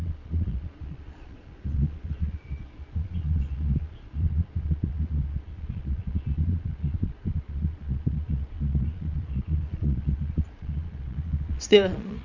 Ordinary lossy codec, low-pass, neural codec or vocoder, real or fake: none; 7.2 kHz; vocoder, 22.05 kHz, 80 mel bands, Vocos; fake